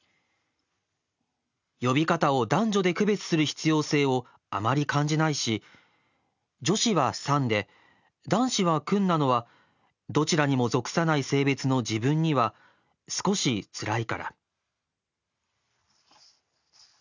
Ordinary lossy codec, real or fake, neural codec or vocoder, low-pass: none; real; none; 7.2 kHz